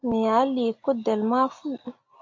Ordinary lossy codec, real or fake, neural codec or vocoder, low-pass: AAC, 48 kbps; real; none; 7.2 kHz